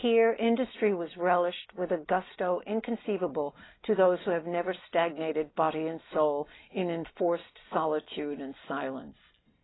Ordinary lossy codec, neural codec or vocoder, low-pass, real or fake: AAC, 16 kbps; none; 7.2 kHz; real